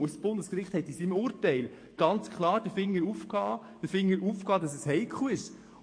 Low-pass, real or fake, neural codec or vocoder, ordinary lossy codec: 9.9 kHz; fake; codec, 44.1 kHz, 7.8 kbps, DAC; MP3, 48 kbps